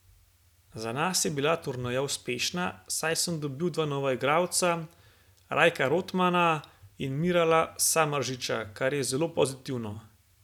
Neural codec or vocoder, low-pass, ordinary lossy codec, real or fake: none; 19.8 kHz; none; real